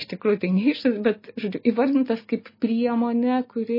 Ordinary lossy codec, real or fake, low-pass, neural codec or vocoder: MP3, 24 kbps; real; 5.4 kHz; none